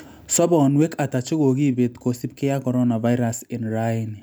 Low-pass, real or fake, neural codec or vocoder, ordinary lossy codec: none; real; none; none